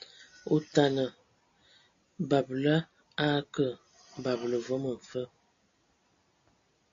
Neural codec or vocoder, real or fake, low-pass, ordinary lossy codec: none; real; 7.2 kHz; AAC, 48 kbps